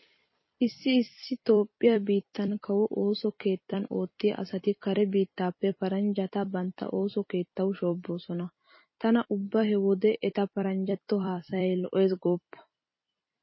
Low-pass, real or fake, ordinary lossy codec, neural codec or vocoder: 7.2 kHz; fake; MP3, 24 kbps; vocoder, 44.1 kHz, 128 mel bands every 256 samples, BigVGAN v2